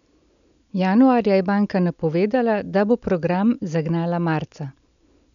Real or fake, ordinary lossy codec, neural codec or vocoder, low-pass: real; none; none; 7.2 kHz